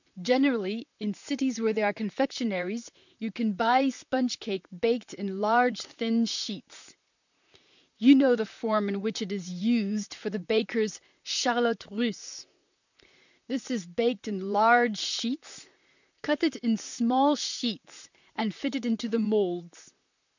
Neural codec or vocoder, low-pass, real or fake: vocoder, 44.1 kHz, 128 mel bands, Pupu-Vocoder; 7.2 kHz; fake